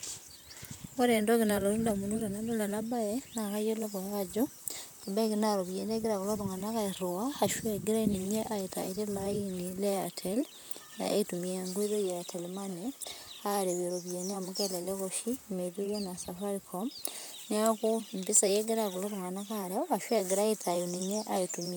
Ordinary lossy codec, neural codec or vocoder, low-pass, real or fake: none; vocoder, 44.1 kHz, 128 mel bands, Pupu-Vocoder; none; fake